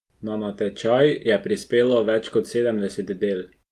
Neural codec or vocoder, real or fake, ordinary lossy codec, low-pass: none; real; Opus, 32 kbps; 14.4 kHz